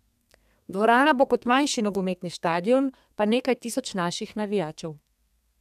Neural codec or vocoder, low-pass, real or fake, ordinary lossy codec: codec, 32 kHz, 1.9 kbps, SNAC; 14.4 kHz; fake; none